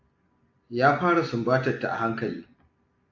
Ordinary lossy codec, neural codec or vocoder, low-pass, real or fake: AAC, 48 kbps; none; 7.2 kHz; real